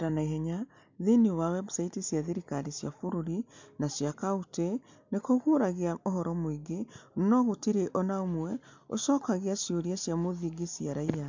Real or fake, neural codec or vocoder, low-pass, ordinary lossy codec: real; none; 7.2 kHz; MP3, 64 kbps